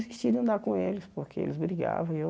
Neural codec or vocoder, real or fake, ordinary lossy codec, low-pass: none; real; none; none